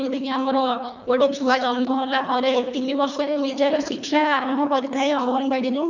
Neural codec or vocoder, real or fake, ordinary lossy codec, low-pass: codec, 24 kHz, 1.5 kbps, HILCodec; fake; none; 7.2 kHz